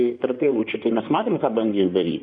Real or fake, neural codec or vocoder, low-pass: fake; codec, 16 kHz in and 24 kHz out, 2.2 kbps, FireRedTTS-2 codec; 9.9 kHz